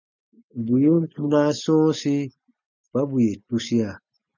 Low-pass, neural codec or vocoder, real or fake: 7.2 kHz; none; real